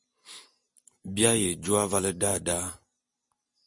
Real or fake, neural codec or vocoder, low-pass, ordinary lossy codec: real; none; 10.8 kHz; MP3, 48 kbps